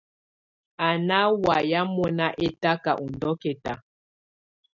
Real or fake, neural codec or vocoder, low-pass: real; none; 7.2 kHz